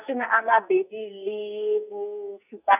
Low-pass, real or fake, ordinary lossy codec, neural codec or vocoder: 3.6 kHz; fake; none; codec, 44.1 kHz, 2.6 kbps, SNAC